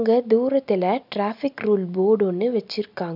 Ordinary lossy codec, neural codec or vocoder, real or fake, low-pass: none; none; real; 5.4 kHz